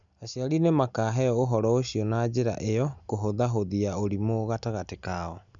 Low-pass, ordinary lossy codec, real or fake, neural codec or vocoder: 7.2 kHz; none; real; none